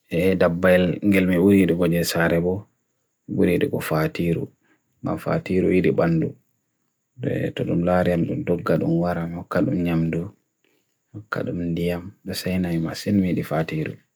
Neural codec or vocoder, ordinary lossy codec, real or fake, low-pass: none; none; real; none